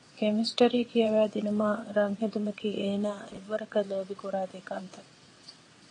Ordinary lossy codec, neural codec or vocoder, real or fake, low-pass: AAC, 32 kbps; vocoder, 22.05 kHz, 80 mel bands, WaveNeXt; fake; 9.9 kHz